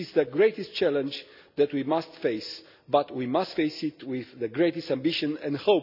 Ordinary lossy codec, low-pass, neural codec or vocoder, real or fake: none; 5.4 kHz; none; real